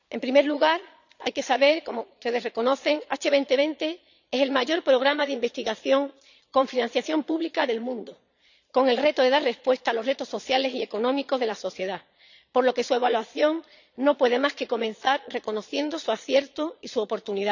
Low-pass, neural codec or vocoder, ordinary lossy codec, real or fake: 7.2 kHz; vocoder, 22.05 kHz, 80 mel bands, Vocos; none; fake